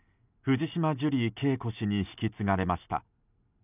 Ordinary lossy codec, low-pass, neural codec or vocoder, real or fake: none; 3.6 kHz; none; real